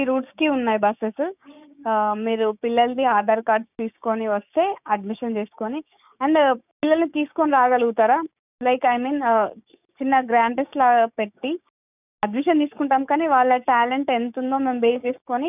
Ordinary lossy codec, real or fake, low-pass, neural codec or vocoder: none; fake; 3.6 kHz; codec, 16 kHz, 6 kbps, DAC